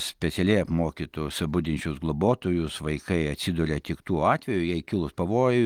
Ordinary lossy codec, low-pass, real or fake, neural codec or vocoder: Opus, 24 kbps; 19.8 kHz; real; none